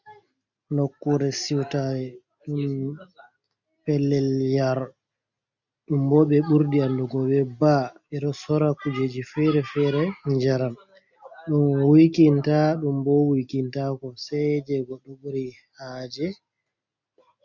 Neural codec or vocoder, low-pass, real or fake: none; 7.2 kHz; real